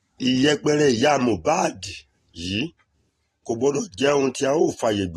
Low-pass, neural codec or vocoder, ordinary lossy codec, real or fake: 19.8 kHz; none; AAC, 32 kbps; real